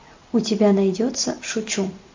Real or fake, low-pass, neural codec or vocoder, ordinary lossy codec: real; 7.2 kHz; none; MP3, 48 kbps